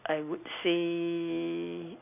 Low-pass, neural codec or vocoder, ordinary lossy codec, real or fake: 3.6 kHz; none; none; real